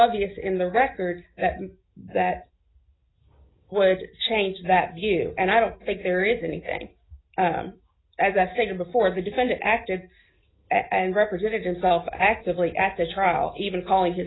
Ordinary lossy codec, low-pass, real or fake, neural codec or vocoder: AAC, 16 kbps; 7.2 kHz; real; none